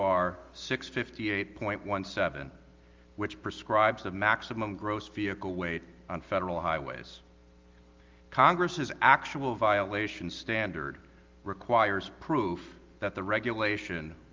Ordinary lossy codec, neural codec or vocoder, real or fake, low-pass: Opus, 32 kbps; none; real; 7.2 kHz